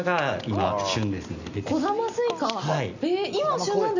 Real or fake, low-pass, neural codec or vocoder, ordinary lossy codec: real; 7.2 kHz; none; none